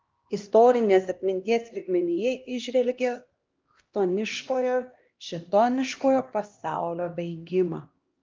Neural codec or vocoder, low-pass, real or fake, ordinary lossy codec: codec, 16 kHz, 1 kbps, X-Codec, HuBERT features, trained on LibriSpeech; 7.2 kHz; fake; Opus, 24 kbps